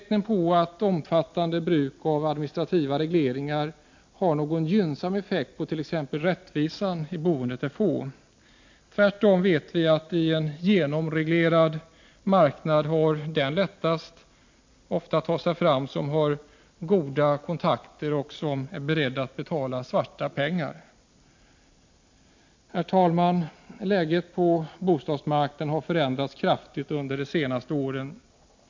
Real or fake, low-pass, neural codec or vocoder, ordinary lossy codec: real; 7.2 kHz; none; MP3, 48 kbps